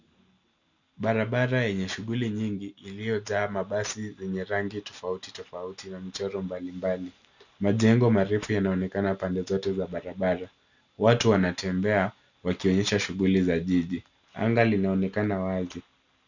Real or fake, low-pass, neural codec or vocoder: real; 7.2 kHz; none